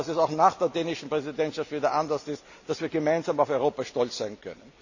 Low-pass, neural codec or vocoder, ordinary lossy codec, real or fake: 7.2 kHz; none; none; real